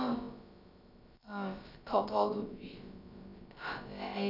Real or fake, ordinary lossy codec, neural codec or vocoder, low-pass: fake; none; codec, 16 kHz, about 1 kbps, DyCAST, with the encoder's durations; 5.4 kHz